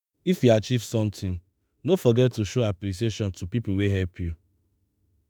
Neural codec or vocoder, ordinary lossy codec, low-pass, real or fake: autoencoder, 48 kHz, 32 numbers a frame, DAC-VAE, trained on Japanese speech; none; none; fake